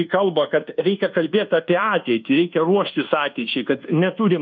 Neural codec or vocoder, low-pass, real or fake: codec, 24 kHz, 1.2 kbps, DualCodec; 7.2 kHz; fake